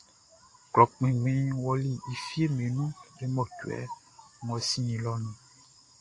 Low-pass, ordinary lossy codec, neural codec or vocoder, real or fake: 10.8 kHz; AAC, 48 kbps; none; real